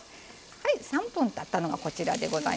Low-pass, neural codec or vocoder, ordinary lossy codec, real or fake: none; none; none; real